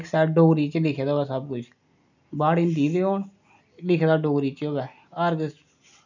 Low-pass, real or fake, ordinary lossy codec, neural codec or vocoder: 7.2 kHz; real; none; none